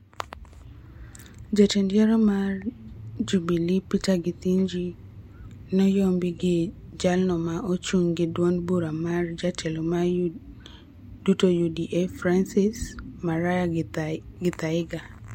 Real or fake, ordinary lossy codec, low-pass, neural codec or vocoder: real; MP3, 64 kbps; 19.8 kHz; none